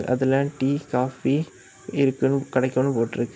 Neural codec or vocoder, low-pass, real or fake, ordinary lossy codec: none; none; real; none